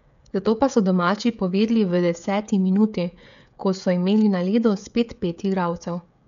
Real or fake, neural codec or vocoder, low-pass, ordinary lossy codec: fake; codec, 16 kHz, 16 kbps, FreqCodec, smaller model; 7.2 kHz; none